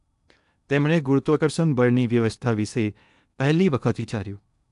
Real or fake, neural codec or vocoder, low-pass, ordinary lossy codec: fake; codec, 16 kHz in and 24 kHz out, 0.8 kbps, FocalCodec, streaming, 65536 codes; 10.8 kHz; none